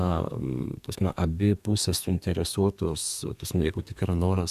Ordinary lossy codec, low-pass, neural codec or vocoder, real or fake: Opus, 64 kbps; 14.4 kHz; codec, 32 kHz, 1.9 kbps, SNAC; fake